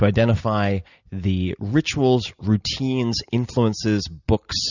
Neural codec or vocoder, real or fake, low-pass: none; real; 7.2 kHz